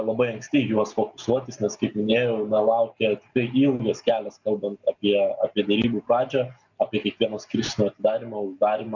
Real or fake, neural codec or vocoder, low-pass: real; none; 7.2 kHz